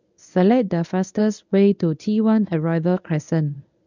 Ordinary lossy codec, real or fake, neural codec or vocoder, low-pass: none; fake; codec, 24 kHz, 0.9 kbps, WavTokenizer, medium speech release version 1; 7.2 kHz